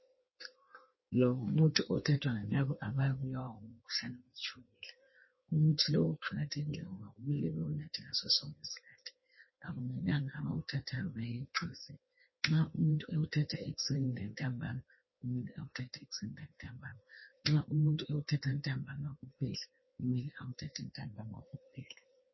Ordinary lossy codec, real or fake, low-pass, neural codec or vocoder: MP3, 24 kbps; fake; 7.2 kHz; codec, 16 kHz in and 24 kHz out, 1.1 kbps, FireRedTTS-2 codec